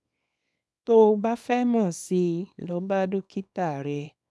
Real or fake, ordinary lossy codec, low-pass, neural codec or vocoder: fake; none; none; codec, 24 kHz, 0.9 kbps, WavTokenizer, small release